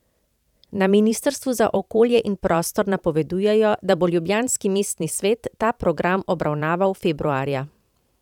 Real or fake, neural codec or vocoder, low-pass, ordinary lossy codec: fake; vocoder, 44.1 kHz, 128 mel bands every 512 samples, BigVGAN v2; 19.8 kHz; none